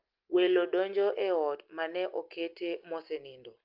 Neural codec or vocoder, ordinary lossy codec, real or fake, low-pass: none; Opus, 24 kbps; real; 5.4 kHz